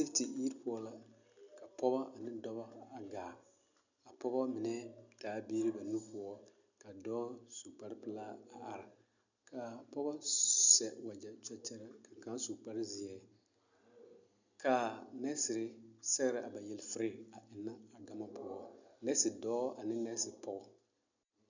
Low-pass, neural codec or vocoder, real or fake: 7.2 kHz; none; real